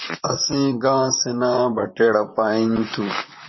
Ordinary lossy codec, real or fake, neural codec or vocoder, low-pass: MP3, 24 kbps; fake; vocoder, 44.1 kHz, 128 mel bands, Pupu-Vocoder; 7.2 kHz